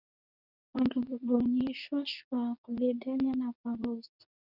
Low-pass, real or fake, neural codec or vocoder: 5.4 kHz; fake; codec, 24 kHz, 0.9 kbps, WavTokenizer, medium speech release version 2